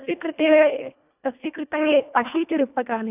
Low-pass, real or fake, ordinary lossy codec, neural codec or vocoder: 3.6 kHz; fake; none; codec, 24 kHz, 1.5 kbps, HILCodec